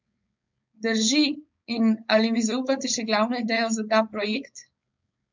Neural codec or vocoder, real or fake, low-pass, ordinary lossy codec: codec, 16 kHz, 4.8 kbps, FACodec; fake; 7.2 kHz; MP3, 64 kbps